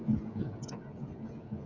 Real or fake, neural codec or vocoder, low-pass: fake; codec, 16 kHz, 16 kbps, FreqCodec, smaller model; 7.2 kHz